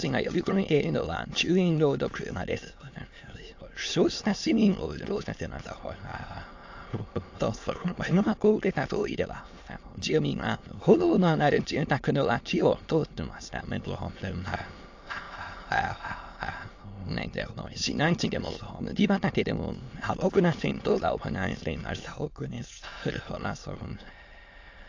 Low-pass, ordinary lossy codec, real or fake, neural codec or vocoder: 7.2 kHz; AAC, 48 kbps; fake; autoencoder, 22.05 kHz, a latent of 192 numbers a frame, VITS, trained on many speakers